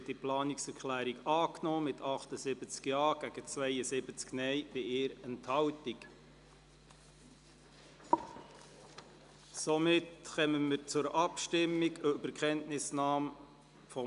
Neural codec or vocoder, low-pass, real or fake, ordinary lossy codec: none; 10.8 kHz; real; none